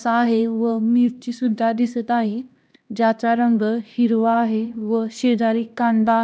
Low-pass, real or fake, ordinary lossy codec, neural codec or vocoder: none; fake; none; codec, 16 kHz, 1 kbps, X-Codec, HuBERT features, trained on LibriSpeech